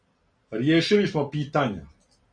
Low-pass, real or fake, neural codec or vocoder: 9.9 kHz; real; none